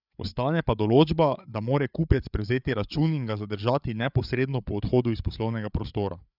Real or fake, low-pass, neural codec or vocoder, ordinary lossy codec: fake; 5.4 kHz; codec, 16 kHz, 8 kbps, FreqCodec, larger model; none